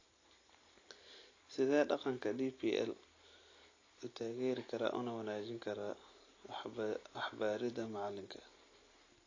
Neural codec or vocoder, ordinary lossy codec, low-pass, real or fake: none; AAC, 32 kbps; 7.2 kHz; real